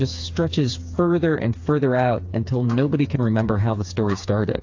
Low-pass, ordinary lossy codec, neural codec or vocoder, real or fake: 7.2 kHz; AAC, 48 kbps; codec, 16 kHz, 4 kbps, FreqCodec, smaller model; fake